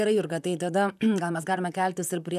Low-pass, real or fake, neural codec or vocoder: 14.4 kHz; fake; vocoder, 44.1 kHz, 128 mel bands, Pupu-Vocoder